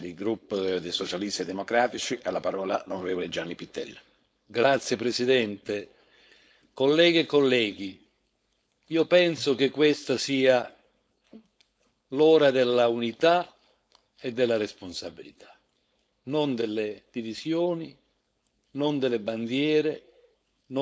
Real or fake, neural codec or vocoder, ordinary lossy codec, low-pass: fake; codec, 16 kHz, 4.8 kbps, FACodec; none; none